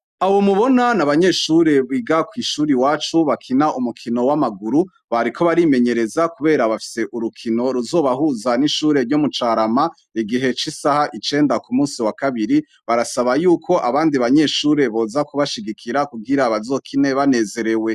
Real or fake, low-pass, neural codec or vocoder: real; 14.4 kHz; none